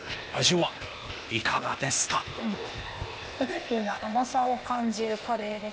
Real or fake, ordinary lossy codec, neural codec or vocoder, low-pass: fake; none; codec, 16 kHz, 0.8 kbps, ZipCodec; none